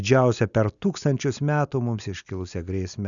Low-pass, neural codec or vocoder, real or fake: 7.2 kHz; none; real